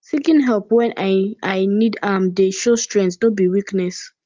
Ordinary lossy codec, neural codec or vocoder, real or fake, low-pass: Opus, 32 kbps; none; real; 7.2 kHz